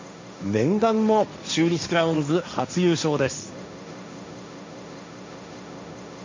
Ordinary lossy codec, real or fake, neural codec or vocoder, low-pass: MP3, 64 kbps; fake; codec, 16 kHz, 1.1 kbps, Voila-Tokenizer; 7.2 kHz